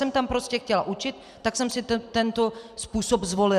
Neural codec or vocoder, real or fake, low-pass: vocoder, 44.1 kHz, 128 mel bands every 256 samples, BigVGAN v2; fake; 14.4 kHz